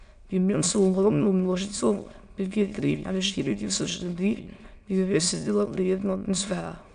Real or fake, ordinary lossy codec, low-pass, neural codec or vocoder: fake; MP3, 64 kbps; 9.9 kHz; autoencoder, 22.05 kHz, a latent of 192 numbers a frame, VITS, trained on many speakers